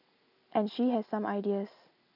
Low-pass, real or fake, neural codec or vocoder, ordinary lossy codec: 5.4 kHz; real; none; none